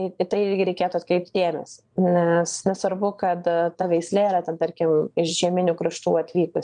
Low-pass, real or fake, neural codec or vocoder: 9.9 kHz; fake; vocoder, 22.05 kHz, 80 mel bands, Vocos